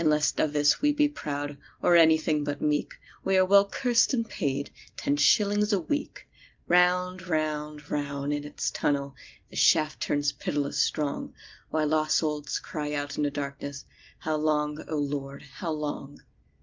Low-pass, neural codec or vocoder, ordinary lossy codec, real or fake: 7.2 kHz; none; Opus, 32 kbps; real